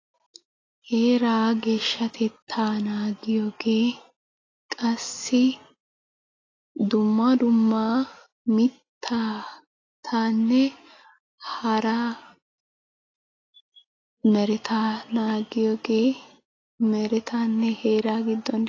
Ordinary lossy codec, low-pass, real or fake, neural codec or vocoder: AAC, 32 kbps; 7.2 kHz; real; none